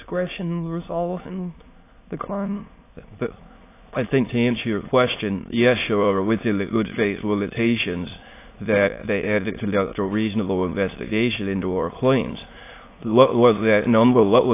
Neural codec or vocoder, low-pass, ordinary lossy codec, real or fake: autoencoder, 22.05 kHz, a latent of 192 numbers a frame, VITS, trained on many speakers; 3.6 kHz; AAC, 24 kbps; fake